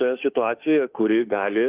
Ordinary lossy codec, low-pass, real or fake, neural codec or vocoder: Opus, 32 kbps; 3.6 kHz; fake; autoencoder, 48 kHz, 32 numbers a frame, DAC-VAE, trained on Japanese speech